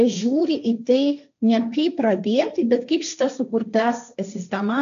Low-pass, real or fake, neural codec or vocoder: 7.2 kHz; fake; codec, 16 kHz, 1.1 kbps, Voila-Tokenizer